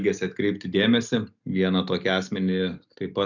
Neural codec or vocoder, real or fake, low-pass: none; real; 7.2 kHz